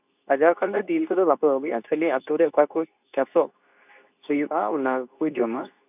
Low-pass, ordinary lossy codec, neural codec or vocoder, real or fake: 3.6 kHz; none; codec, 24 kHz, 0.9 kbps, WavTokenizer, medium speech release version 2; fake